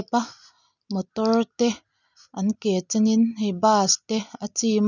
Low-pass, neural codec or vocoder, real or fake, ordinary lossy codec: 7.2 kHz; none; real; none